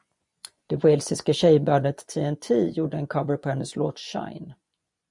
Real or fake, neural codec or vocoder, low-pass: fake; vocoder, 24 kHz, 100 mel bands, Vocos; 10.8 kHz